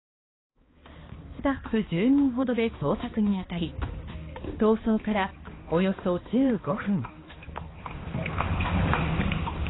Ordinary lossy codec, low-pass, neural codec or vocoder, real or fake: AAC, 16 kbps; 7.2 kHz; codec, 16 kHz, 2 kbps, X-Codec, HuBERT features, trained on balanced general audio; fake